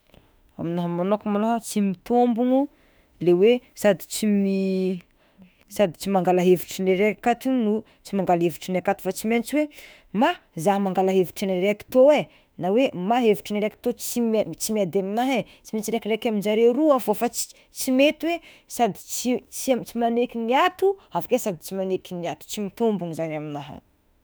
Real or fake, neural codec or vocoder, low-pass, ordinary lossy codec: fake; autoencoder, 48 kHz, 32 numbers a frame, DAC-VAE, trained on Japanese speech; none; none